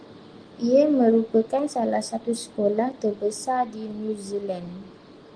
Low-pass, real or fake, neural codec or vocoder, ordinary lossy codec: 9.9 kHz; real; none; Opus, 24 kbps